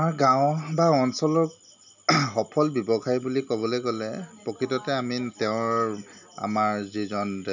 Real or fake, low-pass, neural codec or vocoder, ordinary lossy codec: real; 7.2 kHz; none; none